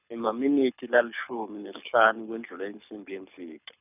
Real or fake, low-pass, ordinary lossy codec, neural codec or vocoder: fake; 3.6 kHz; none; codec, 24 kHz, 6 kbps, HILCodec